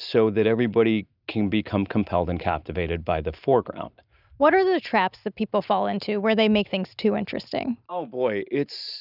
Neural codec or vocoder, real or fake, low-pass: none; real; 5.4 kHz